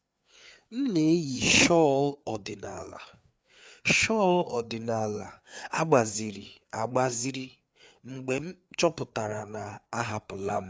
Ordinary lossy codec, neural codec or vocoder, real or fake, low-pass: none; codec, 16 kHz, 4 kbps, FreqCodec, larger model; fake; none